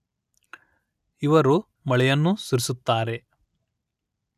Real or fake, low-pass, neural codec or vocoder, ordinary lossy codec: real; 14.4 kHz; none; none